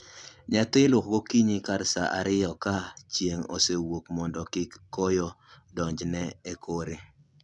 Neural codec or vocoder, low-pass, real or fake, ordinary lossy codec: none; 10.8 kHz; real; none